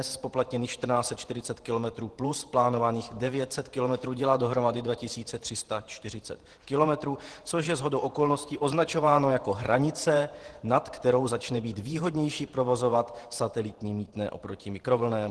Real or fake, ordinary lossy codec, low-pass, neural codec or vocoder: fake; Opus, 16 kbps; 10.8 kHz; vocoder, 48 kHz, 128 mel bands, Vocos